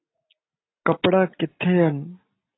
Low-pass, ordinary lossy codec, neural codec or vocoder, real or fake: 7.2 kHz; AAC, 16 kbps; none; real